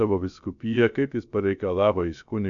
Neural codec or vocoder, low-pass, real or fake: codec, 16 kHz, about 1 kbps, DyCAST, with the encoder's durations; 7.2 kHz; fake